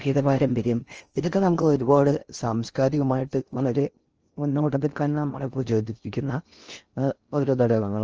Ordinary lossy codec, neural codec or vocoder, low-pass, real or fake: Opus, 24 kbps; codec, 16 kHz in and 24 kHz out, 0.6 kbps, FocalCodec, streaming, 4096 codes; 7.2 kHz; fake